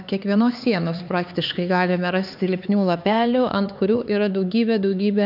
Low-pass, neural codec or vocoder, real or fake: 5.4 kHz; codec, 16 kHz, 4 kbps, X-Codec, HuBERT features, trained on LibriSpeech; fake